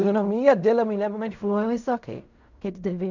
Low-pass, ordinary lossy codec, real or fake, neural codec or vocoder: 7.2 kHz; none; fake; codec, 16 kHz in and 24 kHz out, 0.4 kbps, LongCat-Audio-Codec, fine tuned four codebook decoder